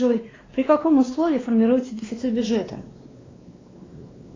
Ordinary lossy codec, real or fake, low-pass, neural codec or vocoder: AAC, 32 kbps; fake; 7.2 kHz; codec, 16 kHz, 2 kbps, X-Codec, WavLM features, trained on Multilingual LibriSpeech